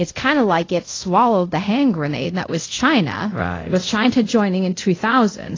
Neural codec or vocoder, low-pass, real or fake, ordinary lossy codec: codec, 24 kHz, 0.5 kbps, DualCodec; 7.2 kHz; fake; AAC, 32 kbps